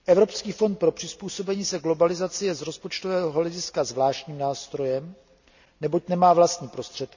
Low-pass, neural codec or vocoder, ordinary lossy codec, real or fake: 7.2 kHz; none; none; real